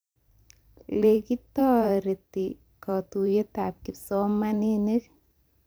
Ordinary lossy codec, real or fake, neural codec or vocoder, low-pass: none; fake; vocoder, 44.1 kHz, 128 mel bands every 512 samples, BigVGAN v2; none